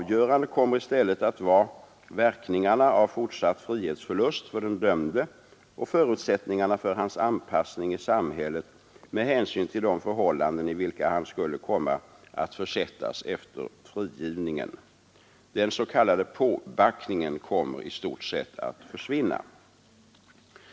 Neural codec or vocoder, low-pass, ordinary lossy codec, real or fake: none; none; none; real